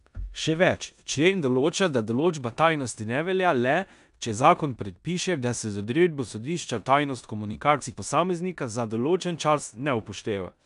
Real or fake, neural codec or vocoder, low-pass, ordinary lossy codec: fake; codec, 16 kHz in and 24 kHz out, 0.9 kbps, LongCat-Audio-Codec, four codebook decoder; 10.8 kHz; none